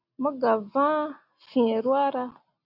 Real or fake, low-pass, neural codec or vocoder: real; 5.4 kHz; none